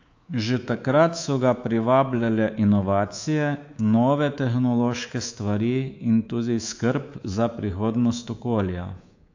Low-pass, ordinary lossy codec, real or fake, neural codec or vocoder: 7.2 kHz; AAC, 48 kbps; fake; codec, 24 kHz, 3.1 kbps, DualCodec